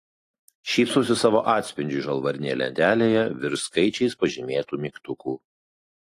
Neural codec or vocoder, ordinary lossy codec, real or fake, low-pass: none; AAC, 48 kbps; real; 14.4 kHz